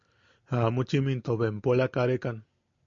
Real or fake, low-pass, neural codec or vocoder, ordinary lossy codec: real; 7.2 kHz; none; MP3, 48 kbps